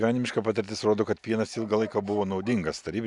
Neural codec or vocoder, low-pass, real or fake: none; 10.8 kHz; real